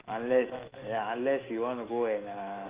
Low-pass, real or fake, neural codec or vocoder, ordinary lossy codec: 3.6 kHz; fake; autoencoder, 48 kHz, 128 numbers a frame, DAC-VAE, trained on Japanese speech; Opus, 24 kbps